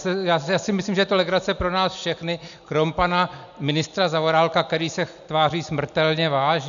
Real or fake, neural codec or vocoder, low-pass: real; none; 7.2 kHz